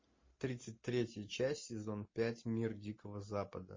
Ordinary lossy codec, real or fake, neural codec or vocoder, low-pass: MP3, 32 kbps; real; none; 7.2 kHz